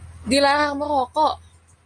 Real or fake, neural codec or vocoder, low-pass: real; none; 9.9 kHz